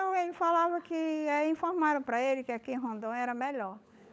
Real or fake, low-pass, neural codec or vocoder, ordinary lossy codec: fake; none; codec, 16 kHz, 16 kbps, FunCodec, trained on LibriTTS, 50 frames a second; none